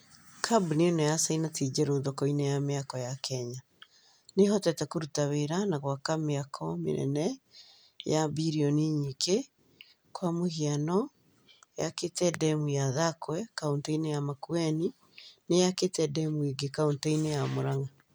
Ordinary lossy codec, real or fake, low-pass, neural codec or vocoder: none; real; none; none